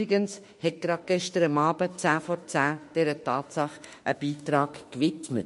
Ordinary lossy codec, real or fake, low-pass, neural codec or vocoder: MP3, 48 kbps; fake; 14.4 kHz; autoencoder, 48 kHz, 32 numbers a frame, DAC-VAE, trained on Japanese speech